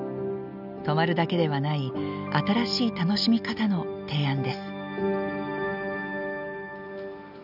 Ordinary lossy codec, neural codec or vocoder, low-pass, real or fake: none; none; 5.4 kHz; real